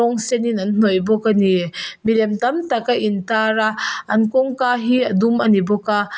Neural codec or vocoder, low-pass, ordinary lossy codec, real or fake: none; none; none; real